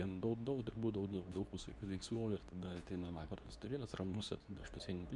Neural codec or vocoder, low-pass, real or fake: codec, 24 kHz, 0.9 kbps, WavTokenizer, medium speech release version 2; 10.8 kHz; fake